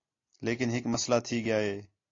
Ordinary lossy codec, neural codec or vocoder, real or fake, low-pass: AAC, 32 kbps; none; real; 7.2 kHz